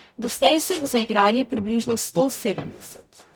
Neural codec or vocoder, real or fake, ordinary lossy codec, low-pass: codec, 44.1 kHz, 0.9 kbps, DAC; fake; none; none